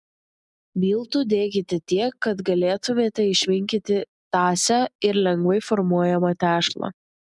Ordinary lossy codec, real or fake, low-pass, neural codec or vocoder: MP3, 96 kbps; real; 10.8 kHz; none